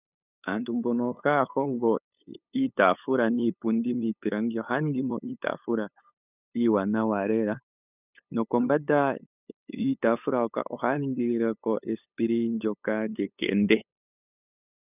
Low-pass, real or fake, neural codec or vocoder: 3.6 kHz; fake; codec, 16 kHz, 8 kbps, FunCodec, trained on LibriTTS, 25 frames a second